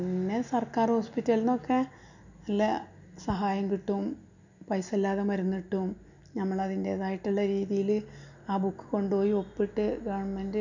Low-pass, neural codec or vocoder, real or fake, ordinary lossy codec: 7.2 kHz; none; real; none